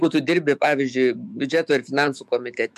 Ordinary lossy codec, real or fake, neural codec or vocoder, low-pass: MP3, 96 kbps; fake; autoencoder, 48 kHz, 128 numbers a frame, DAC-VAE, trained on Japanese speech; 14.4 kHz